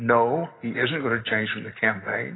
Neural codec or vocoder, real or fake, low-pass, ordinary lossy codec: none; real; 7.2 kHz; AAC, 16 kbps